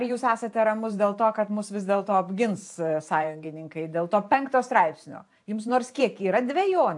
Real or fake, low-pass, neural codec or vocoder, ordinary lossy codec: fake; 10.8 kHz; vocoder, 44.1 kHz, 128 mel bands every 512 samples, BigVGAN v2; AAC, 64 kbps